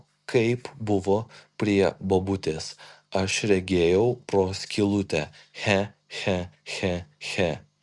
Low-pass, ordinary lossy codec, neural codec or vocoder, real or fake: 10.8 kHz; Opus, 64 kbps; none; real